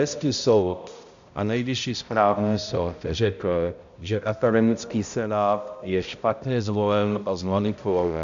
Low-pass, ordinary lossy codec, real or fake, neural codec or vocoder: 7.2 kHz; AAC, 64 kbps; fake; codec, 16 kHz, 0.5 kbps, X-Codec, HuBERT features, trained on balanced general audio